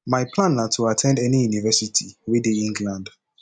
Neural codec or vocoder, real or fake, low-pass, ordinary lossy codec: none; real; 9.9 kHz; none